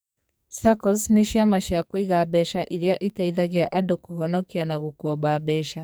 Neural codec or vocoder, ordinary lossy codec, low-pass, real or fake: codec, 44.1 kHz, 2.6 kbps, SNAC; none; none; fake